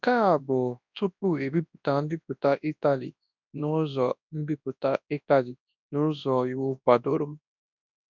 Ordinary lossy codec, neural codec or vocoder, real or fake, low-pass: none; codec, 24 kHz, 0.9 kbps, WavTokenizer, large speech release; fake; 7.2 kHz